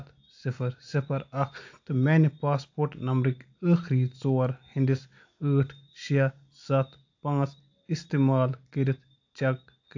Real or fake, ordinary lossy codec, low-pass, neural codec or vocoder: real; AAC, 48 kbps; 7.2 kHz; none